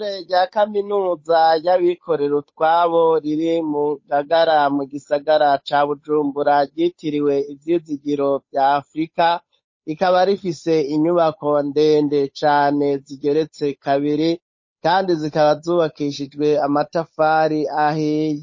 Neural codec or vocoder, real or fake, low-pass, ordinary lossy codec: codec, 16 kHz, 8 kbps, FunCodec, trained on Chinese and English, 25 frames a second; fake; 7.2 kHz; MP3, 32 kbps